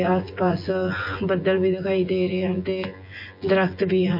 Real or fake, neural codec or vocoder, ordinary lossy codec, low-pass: fake; vocoder, 24 kHz, 100 mel bands, Vocos; MP3, 32 kbps; 5.4 kHz